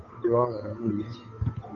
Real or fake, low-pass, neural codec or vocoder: fake; 7.2 kHz; codec, 16 kHz, 8 kbps, FunCodec, trained on Chinese and English, 25 frames a second